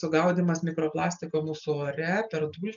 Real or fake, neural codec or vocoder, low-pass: real; none; 7.2 kHz